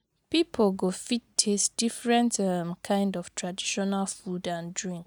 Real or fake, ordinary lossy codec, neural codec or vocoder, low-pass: real; none; none; none